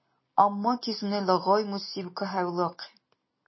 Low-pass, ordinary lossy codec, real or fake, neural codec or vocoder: 7.2 kHz; MP3, 24 kbps; real; none